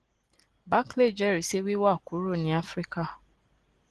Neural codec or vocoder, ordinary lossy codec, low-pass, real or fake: none; Opus, 16 kbps; 10.8 kHz; real